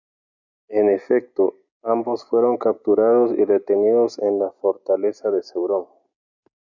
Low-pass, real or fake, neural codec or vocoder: 7.2 kHz; real; none